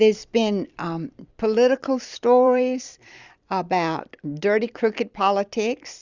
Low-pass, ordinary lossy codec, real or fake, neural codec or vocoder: 7.2 kHz; Opus, 64 kbps; fake; vocoder, 44.1 kHz, 128 mel bands every 256 samples, BigVGAN v2